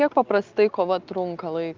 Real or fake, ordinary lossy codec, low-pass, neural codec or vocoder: real; Opus, 24 kbps; 7.2 kHz; none